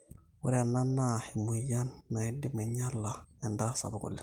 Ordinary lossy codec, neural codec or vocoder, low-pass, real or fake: Opus, 24 kbps; none; 19.8 kHz; real